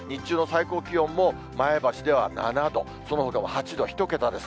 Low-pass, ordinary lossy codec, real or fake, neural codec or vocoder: none; none; real; none